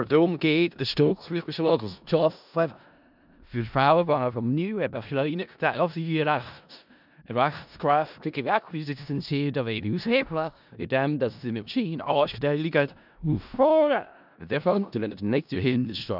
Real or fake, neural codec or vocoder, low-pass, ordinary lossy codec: fake; codec, 16 kHz in and 24 kHz out, 0.4 kbps, LongCat-Audio-Codec, four codebook decoder; 5.4 kHz; none